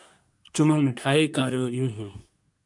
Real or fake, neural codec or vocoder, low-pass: fake; codec, 24 kHz, 1 kbps, SNAC; 10.8 kHz